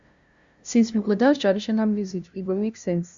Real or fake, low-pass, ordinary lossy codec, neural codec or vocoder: fake; 7.2 kHz; Opus, 64 kbps; codec, 16 kHz, 0.5 kbps, FunCodec, trained on LibriTTS, 25 frames a second